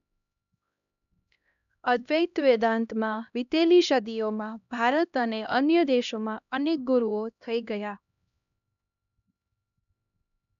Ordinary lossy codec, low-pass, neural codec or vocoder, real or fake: none; 7.2 kHz; codec, 16 kHz, 1 kbps, X-Codec, HuBERT features, trained on LibriSpeech; fake